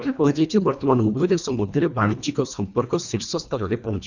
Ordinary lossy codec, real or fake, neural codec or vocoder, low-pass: none; fake; codec, 24 kHz, 1.5 kbps, HILCodec; 7.2 kHz